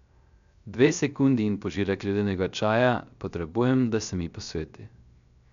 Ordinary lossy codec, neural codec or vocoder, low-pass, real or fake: none; codec, 16 kHz, 0.3 kbps, FocalCodec; 7.2 kHz; fake